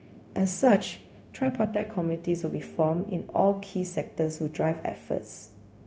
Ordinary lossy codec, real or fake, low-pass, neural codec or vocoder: none; fake; none; codec, 16 kHz, 0.4 kbps, LongCat-Audio-Codec